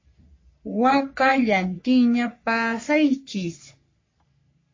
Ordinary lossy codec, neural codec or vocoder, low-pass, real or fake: MP3, 32 kbps; codec, 44.1 kHz, 3.4 kbps, Pupu-Codec; 7.2 kHz; fake